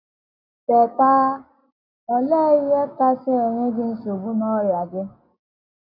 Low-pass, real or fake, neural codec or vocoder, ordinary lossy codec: 5.4 kHz; real; none; AAC, 48 kbps